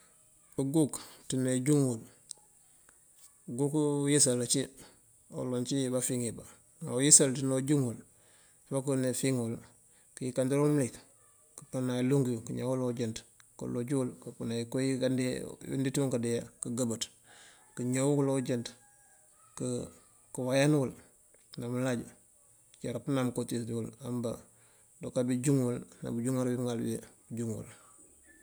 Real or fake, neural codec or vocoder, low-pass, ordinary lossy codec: real; none; none; none